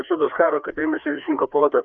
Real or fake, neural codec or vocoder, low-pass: fake; codec, 16 kHz, 2 kbps, FreqCodec, larger model; 7.2 kHz